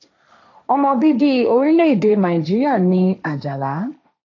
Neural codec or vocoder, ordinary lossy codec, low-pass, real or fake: codec, 16 kHz, 1.1 kbps, Voila-Tokenizer; AAC, 48 kbps; 7.2 kHz; fake